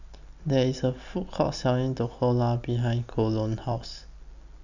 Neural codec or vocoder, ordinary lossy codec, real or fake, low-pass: none; none; real; 7.2 kHz